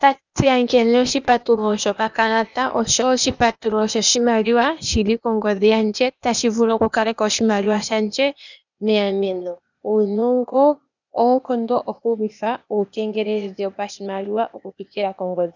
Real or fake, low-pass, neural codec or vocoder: fake; 7.2 kHz; codec, 16 kHz, 0.8 kbps, ZipCodec